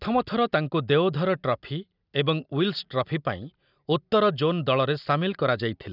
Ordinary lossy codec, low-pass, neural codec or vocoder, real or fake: none; 5.4 kHz; none; real